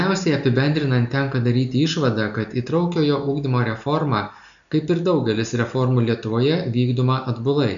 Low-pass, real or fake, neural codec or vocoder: 7.2 kHz; real; none